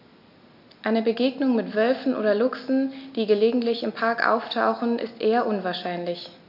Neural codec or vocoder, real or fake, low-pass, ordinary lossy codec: none; real; 5.4 kHz; none